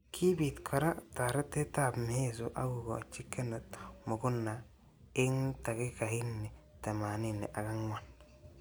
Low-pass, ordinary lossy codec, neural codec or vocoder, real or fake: none; none; none; real